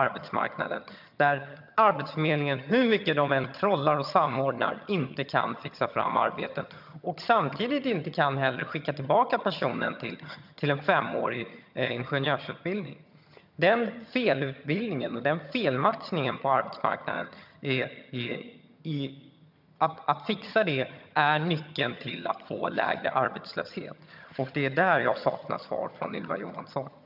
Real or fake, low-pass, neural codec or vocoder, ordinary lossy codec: fake; 5.4 kHz; vocoder, 22.05 kHz, 80 mel bands, HiFi-GAN; none